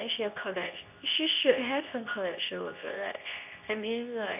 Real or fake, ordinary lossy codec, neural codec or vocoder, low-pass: fake; none; codec, 24 kHz, 0.9 kbps, WavTokenizer, medium speech release version 2; 3.6 kHz